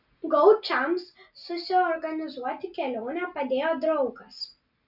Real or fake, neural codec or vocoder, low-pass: real; none; 5.4 kHz